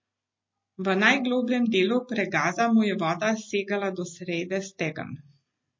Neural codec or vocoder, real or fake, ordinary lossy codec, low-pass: none; real; MP3, 32 kbps; 7.2 kHz